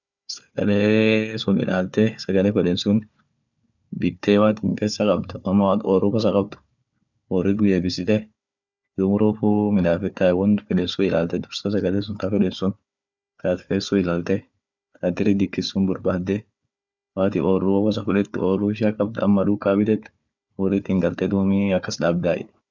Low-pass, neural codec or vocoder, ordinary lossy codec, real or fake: 7.2 kHz; codec, 16 kHz, 4 kbps, FunCodec, trained on Chinese and English, 50 frames a second; none; fake